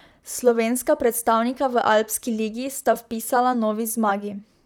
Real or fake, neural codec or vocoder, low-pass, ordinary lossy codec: fake; vocoder, 44.1 kHz, 128 mel bands, Pupu-Vocoder; none; none